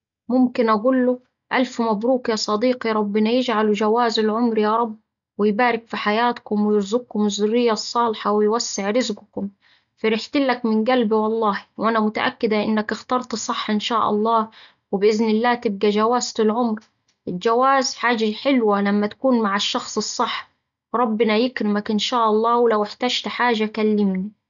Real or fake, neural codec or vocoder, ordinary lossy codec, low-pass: real; none; none; 7.2 kHz